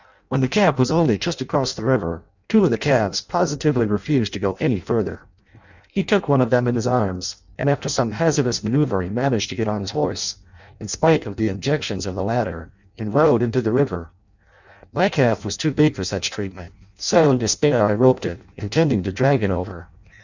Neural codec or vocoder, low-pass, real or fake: codec, 16 kHz in and 24 kHz out, 0.6 kbps, FireRedTTS-2 codec; 7.2 kHz; fake